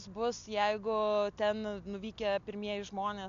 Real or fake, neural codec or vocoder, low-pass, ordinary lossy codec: real; none; 7.2 kHz; AAC, 64 kbps